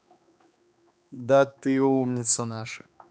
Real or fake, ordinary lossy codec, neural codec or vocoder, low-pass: fake; none; codec, 16 kHz, 2 kbps, X-Codec, HuBERT features, trained on balanced general audio; none